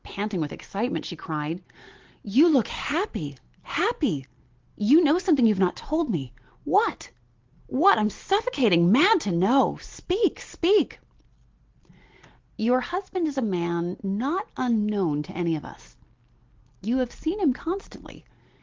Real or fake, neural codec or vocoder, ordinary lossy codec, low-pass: real; none; Opus, 16 kbps; 7.2 kHz